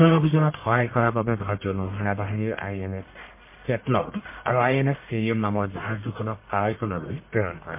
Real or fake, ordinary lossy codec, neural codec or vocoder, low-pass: fake; MP3, 24 kbps; codec, 44.1 kHz, 1.7 kbps, Pupu-Codec; 3.6 kHz